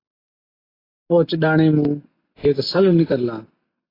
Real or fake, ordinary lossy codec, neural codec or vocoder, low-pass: real; AAC, 24 kbps; none; 5.4 kHz